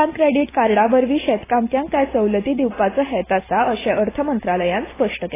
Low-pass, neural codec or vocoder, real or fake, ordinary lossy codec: 3.6 kHz; none; real; AAC, 16 kbps